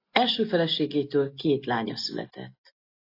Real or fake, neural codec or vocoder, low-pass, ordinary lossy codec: real; none; 5.4 kHz; AAC, 32 kbps